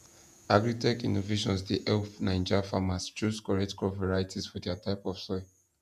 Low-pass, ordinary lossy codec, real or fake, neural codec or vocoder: 14.4 kHz; none; fake; vocoder, 48 kHz, 128 mel bands, Vocos